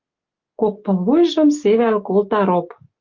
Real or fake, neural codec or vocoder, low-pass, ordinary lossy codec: real; none; 7.2 kHz; Opus, 32 kbps